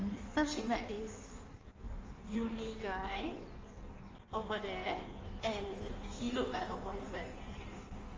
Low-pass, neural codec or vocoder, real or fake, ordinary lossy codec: 7.2 kHz; codec, 16 kHz in and 24 kHz out, 1.1 kbps, FireRedTTS-2 codec; fake; Opus, 32 kbps